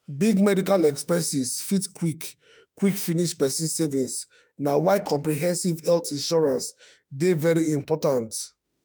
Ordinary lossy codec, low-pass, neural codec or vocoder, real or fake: none; none; autoencoder, 48 kHz, 32 numbers a frame, DAC-VAE, trained on Japanese speech; fake